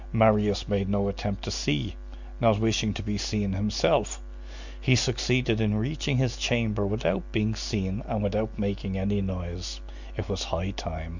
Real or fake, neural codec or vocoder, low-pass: real; none; 7.2 kHz